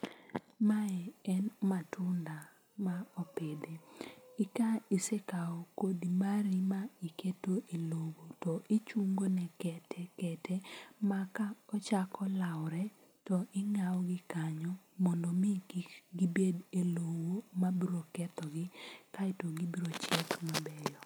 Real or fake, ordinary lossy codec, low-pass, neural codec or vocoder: real; none; none; none